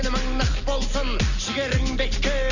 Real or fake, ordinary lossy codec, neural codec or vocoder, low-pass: real; none; none; 7.2 kHz